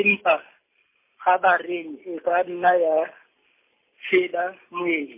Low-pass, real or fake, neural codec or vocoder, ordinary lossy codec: 3.6 kHz; real; none; MP3, 24 kbps